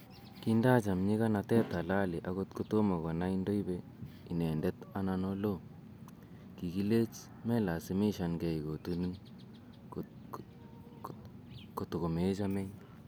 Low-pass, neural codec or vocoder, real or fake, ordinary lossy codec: none; none; real; none